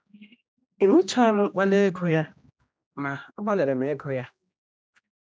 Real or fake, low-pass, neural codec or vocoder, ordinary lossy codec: fake; none; codec, 16 kHz, 1 kbps, X-Codec, HuBERT features, trained on general audio; none